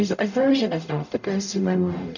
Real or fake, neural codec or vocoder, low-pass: fake; codec, 44.1 kHz, 0.9 kbps, DAC; 7.2 kHz